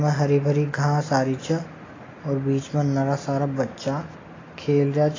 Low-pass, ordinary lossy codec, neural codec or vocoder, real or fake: 7.2 kHz; AAC, 32 kbps; none; real